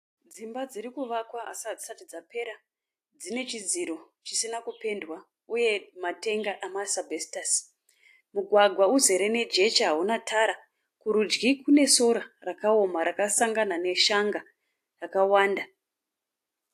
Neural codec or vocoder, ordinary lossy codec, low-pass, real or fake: none; AAC, 64 kbps; 14.4 kHz; real